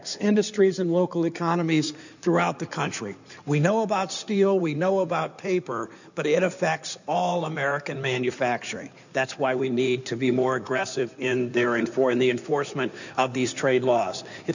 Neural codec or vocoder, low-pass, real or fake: codec, 16 kHz in and 24 kHz out, 2.2 kbps, FireRedTTS-2 codec; 7.2 kHz; fake